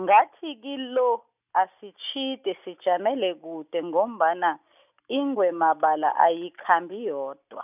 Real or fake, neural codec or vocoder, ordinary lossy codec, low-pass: real; none; none; 3.6 kHz